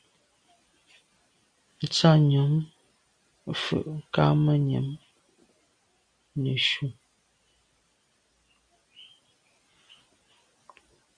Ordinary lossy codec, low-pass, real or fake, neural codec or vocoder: Opus, 64 kbps; 9.9 kHz; real; none